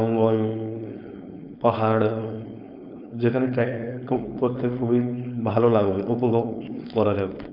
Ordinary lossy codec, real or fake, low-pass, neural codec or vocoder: none; fake; 5.4 kHz; codec, 16 kHz, 4.8 kbps, FACodec